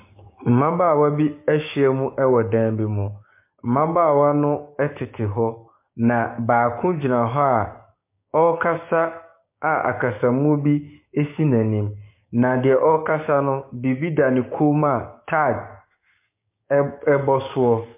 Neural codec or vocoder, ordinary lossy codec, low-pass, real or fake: codec, 16 kHz, 6 kbps, DAC; MP3, 24 kbps; 3.6 kHz; fake